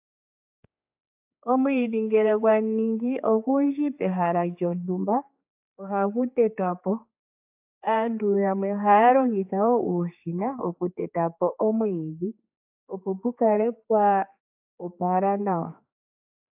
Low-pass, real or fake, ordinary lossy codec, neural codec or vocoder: 3.6 kHz; fake; AAC, 32 kbps; codec, 16 kHz, 4 kbps, X-Codec, HuBERT features, trained on general audio